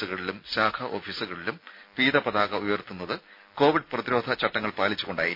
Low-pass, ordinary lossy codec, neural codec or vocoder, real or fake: 5.4 kHz; MP3, 48 kbps; none; real